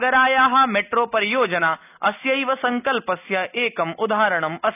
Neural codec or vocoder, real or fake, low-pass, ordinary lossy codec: none; real; 3.6 kHz; none